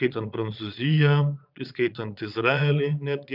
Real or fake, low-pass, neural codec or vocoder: fake; 5.4 kHz; vocoder, 22.05 kHz, 80 mel bands, Vocos